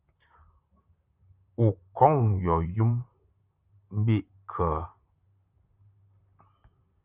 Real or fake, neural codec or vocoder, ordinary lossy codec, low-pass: fake; vocoder, 44.1 kHz, 128 mel bands, Pupu-Vocoder; Opus, 64 kbps; 3.6 kHz